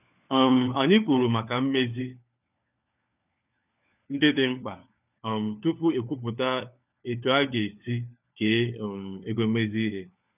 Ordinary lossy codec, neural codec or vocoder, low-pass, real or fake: none; codec, 16 kHz, 4 kbps, FunCodec, trained on LibriTTS, 50 frames a second; 3.6 kHz; fake